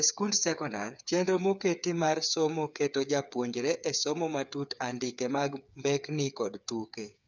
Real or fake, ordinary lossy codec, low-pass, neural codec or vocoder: fake; none; 7.2 kHz; codec, 16 kHz, 8 kbps, FreqCodec, smaller model